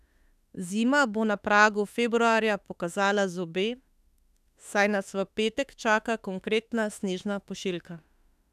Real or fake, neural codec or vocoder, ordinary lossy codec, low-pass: fake; autoencoder, 48 kHz, 32 numbers a frame, DAC-VAE, trained on Japanese speech; none; 14.4 kHz